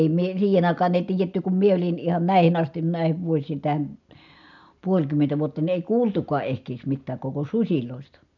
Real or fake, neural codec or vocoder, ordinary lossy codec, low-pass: real; none; none; 7.2 kHz